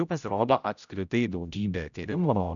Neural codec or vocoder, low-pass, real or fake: codec, 16 kHz, 0.5 kbps, X-Codec, HuBERT features, trained on general audio; 7.2 kHz; fake